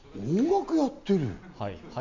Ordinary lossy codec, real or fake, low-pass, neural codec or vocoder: MP3, 48 kbps; real; 7.2 kHz; none